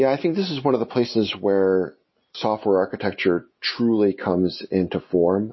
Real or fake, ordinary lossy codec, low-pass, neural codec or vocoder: real; MP3, 24 kbps; 7.2 kHz; none